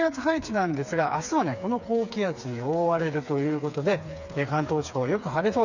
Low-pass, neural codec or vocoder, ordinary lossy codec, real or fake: 7.2 kHz; codec, 16 kHz, 4 kbps, FreqCodec, smaller model; none; fake